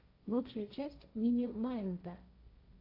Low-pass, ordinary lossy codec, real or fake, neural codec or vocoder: 5.4 kHz; AAC, 48 kbps; fake; codec, 16 kHz, 1.1 kbps, Voila-Tokenizer